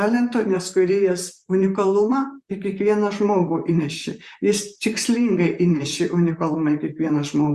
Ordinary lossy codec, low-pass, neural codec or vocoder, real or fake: Opus, 64 kbps; 14.4 kHz; vocoder, 44.1 kHz, 128 mel bands, Pupu-Vocoder; fake